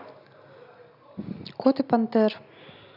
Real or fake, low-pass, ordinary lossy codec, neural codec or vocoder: real; 5.4 kHz; none; none